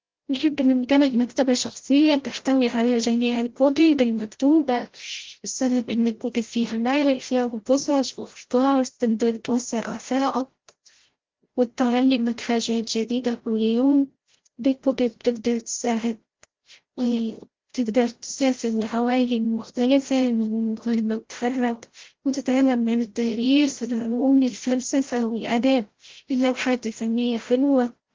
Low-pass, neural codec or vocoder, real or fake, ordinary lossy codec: 7.2 kHz; codec, 16 kHz, 0.5 kbps, FreqCodec, larger model; fake; Opus, 16 kbps